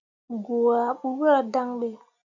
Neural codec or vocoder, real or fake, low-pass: none; real; 7.2 kHz